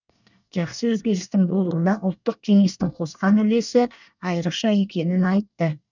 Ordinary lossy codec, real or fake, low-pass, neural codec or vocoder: none; fake; 7.2 kHz; codec, 24 kHz, 1 kbps, SNAC